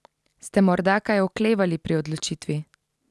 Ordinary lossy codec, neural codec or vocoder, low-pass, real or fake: none; none; none; real